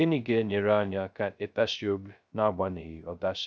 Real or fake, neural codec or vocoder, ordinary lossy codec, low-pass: fake; codec, 16 kHz, 0.2 kbps, FocalCodec; none; none